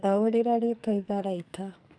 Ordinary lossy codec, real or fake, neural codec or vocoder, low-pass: none; fake; codec, 44.1 kHz, 2.6 kbps, SNAC; 9.9 kHz